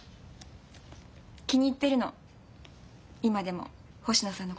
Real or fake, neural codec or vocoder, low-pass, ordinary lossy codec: real; none; none; none